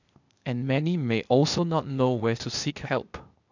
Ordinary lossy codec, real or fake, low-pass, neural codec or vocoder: none; fake; 7.2 kHz; codec, 16 kHz, 0.8 kbps, ZipCodec